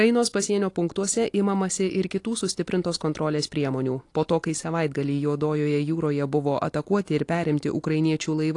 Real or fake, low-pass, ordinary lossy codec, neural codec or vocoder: real; 10.8 kHz; AAC, 48 kbps; none